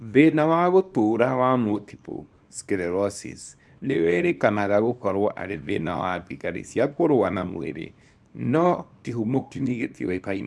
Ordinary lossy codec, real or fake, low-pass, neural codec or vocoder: none; fake; none; codec, 24 kHz, 0.9 kbps, WavTokenizer, small release